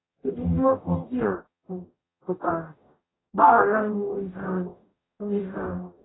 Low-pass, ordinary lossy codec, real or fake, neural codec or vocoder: 7.2 kHz; AAC, 16 kbps; fake; codec, 44.1 kHz, 0.9 kbps, DAC